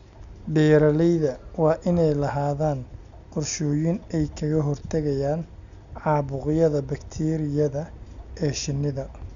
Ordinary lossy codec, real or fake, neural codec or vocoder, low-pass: none; real; none; 7.2 kHz